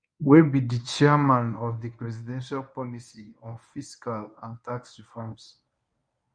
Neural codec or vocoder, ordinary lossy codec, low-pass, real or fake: codec, 24 kHz, 0.9 kbps, WavTokenizer, medium speech release version 1; none; 9.9 kHz; fake